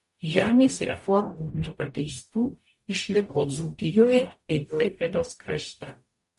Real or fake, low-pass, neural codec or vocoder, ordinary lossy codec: fake; 14.4 kHz; codec, 44.1 kHz, 0.9 kbps, DAC; MP3, 48 kbps